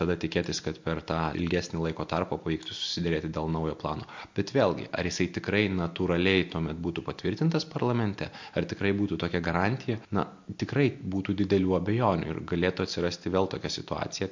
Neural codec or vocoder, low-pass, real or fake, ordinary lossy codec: none; 7.2 kHz; real; MP3, 48 kbps